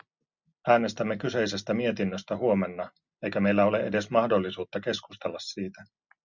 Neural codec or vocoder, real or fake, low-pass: none; real; 7.2 kHz